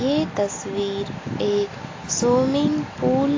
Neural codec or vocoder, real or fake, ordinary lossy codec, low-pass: none; real; AAC, 32 kbps; 7.2 kHz